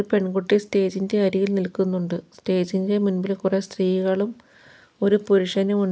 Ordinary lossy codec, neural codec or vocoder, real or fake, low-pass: none; none; real; none